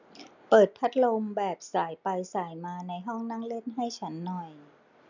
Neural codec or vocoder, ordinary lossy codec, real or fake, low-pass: none; none; real; 7.2 kHz